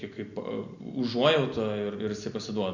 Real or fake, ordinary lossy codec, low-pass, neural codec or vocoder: real; Opus, 64 kbps; 7.2 kHz; none